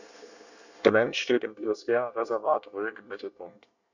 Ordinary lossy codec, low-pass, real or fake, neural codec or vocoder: none; 7.2 kHz; fake; codec, 24 kHz, 1 kbps, SNAC